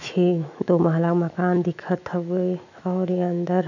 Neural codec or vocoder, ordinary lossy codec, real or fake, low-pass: autoencoder, 48 kHz, 128 numbers a frame, DAC-VAE, trained on Japanese speech; none; fake; 7.2 kHz